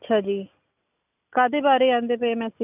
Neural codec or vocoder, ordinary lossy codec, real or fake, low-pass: none; none; real; 3.6 kHz